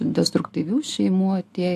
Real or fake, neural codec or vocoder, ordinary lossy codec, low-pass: real; none; AAC, 64 kbps; 14.4 kHz